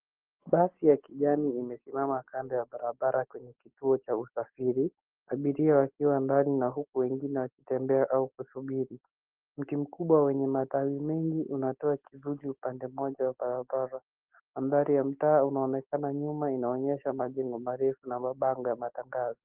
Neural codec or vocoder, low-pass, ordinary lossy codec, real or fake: none; 3.6 kHz; Opus, 16 kbps; real